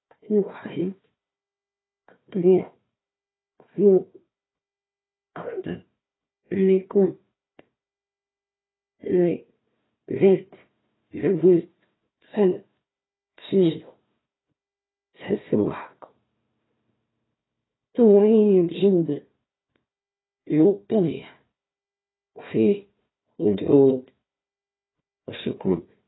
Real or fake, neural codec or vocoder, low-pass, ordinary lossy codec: fake; codec, 16 kHz, 1 kbps, FunCodec, trained on Chinese and English, 50 frames a second; 7.2 kHz; AAC, 16 kbps